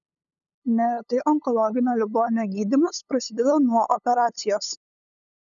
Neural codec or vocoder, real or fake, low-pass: codec, 16 kHz, 8 kbps, FunCodec, trained on LibriTTS, 25 frames a second; fake; 7.2 kHz